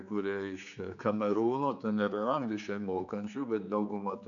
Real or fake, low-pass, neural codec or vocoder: fake; 7.2 kHz; codec, 16 kHz, 4 kbps, X-Codec, HuBERT features, trained on general audio